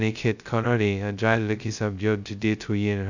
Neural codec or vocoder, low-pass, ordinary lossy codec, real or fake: codec, 16 kHz, 0.2 kbps, FocalCodec; 7.2 kHz; none; fake